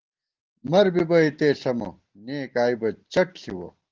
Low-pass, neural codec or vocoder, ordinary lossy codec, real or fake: 7.2 kHz; none; Opus, 16 kbps; real